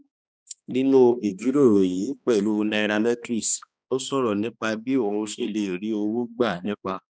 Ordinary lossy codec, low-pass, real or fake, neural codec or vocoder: none; none; fake; codec, 16 kHz, 2 kbps, X-Codec, HuBERT features, trained on balanced general audio